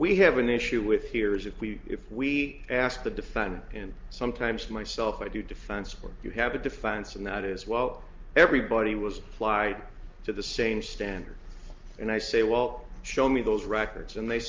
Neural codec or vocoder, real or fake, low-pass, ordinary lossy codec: none; real; 7.2 kHz; Opus, 24 kbps